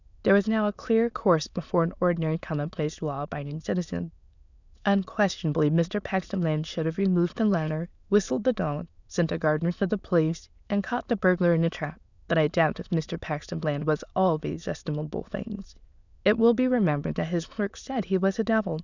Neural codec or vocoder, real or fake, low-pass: autoencoder, 22.05 kHz, a latent of 192 numbers a frame, VITS, trained on many speakers; fake; 7.2 kHz